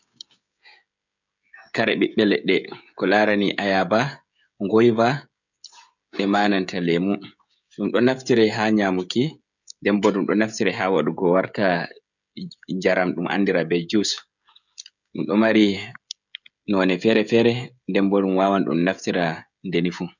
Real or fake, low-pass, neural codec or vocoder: fake; 7.2 kHz; codec, 16 kHz, 16 kbps, FreqCodec, smaller model